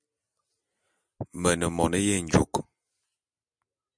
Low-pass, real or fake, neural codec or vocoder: 9.9 kHz; real; none